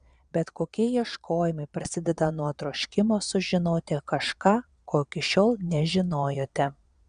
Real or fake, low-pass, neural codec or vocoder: fake; 9.9 kHz; vocoder, 22.05 kHz, 80 mel bands, Vocos